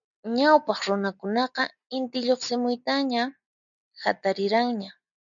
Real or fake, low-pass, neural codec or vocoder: real; 7.2 kHz; none